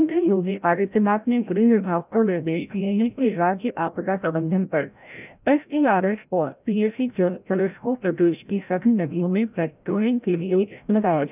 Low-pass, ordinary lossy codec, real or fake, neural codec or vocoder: 3.6 kHz; none; fake; codec, 16 kHz, 0.5 kbps, FreqCodec, larger model